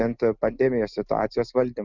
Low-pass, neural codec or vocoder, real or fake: 7.2 kHz; none; real